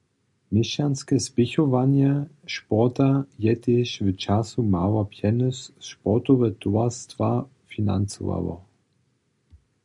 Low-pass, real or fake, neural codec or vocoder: 10.8 kHz; real; none